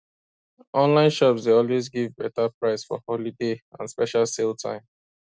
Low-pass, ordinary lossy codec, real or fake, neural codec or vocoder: none; none; real; none